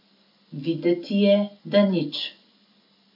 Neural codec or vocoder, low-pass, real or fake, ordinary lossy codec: none; 5.4 kHz; real; none